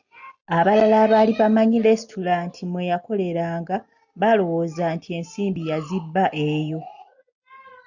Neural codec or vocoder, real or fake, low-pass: none; real; 7.2 kHz